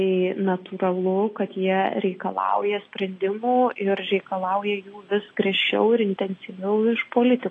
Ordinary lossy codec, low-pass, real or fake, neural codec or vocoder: AAC, 32 kbps; 9.9 kHz; real; none